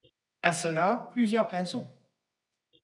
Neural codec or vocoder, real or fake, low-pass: codec, 24 kHz, 0.9 kbps, WavTokenizer, medium music audio release; fake; 10.8 kHz